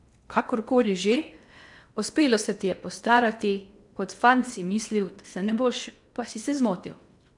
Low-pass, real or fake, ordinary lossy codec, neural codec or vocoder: 10.8 kHz; fake; MP3, 96 kbps; codec, 16 kHz in and 24 kHz out, 0.8 kbps, FocalCodec, streaming, 65536 codes